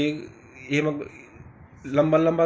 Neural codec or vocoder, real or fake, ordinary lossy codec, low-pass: none; real; none; none